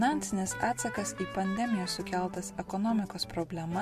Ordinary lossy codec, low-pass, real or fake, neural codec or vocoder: MP3, 64 kbps; 14.4 kHz; real; none